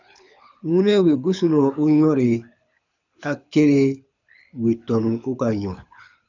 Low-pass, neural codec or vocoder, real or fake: 7.2 kHz; codec, 24 kHz, 6 kbps, HILCodec; fake